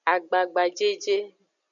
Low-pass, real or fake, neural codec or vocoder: 7.2 kHz; real; none